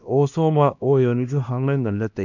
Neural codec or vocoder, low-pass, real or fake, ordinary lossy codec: codec, 16 kHz, 1 kbps, X-Codec, HuBERT features, trained on LibriSpeech; 7.2 kHz; fake; none